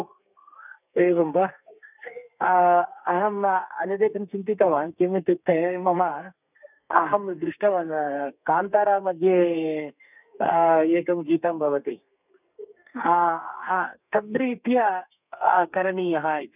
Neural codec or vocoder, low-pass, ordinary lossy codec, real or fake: codec, 32 kHz, 1.9 kbps, SNAC; 3.6 kHz; none; fake